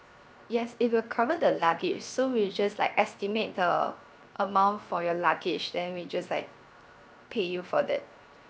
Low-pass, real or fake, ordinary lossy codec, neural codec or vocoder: none; fake; none; codec, 16 kHz, 0.7 kbps, FocalCodec